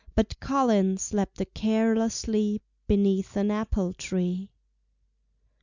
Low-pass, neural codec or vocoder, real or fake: 7.2 kHz; none; real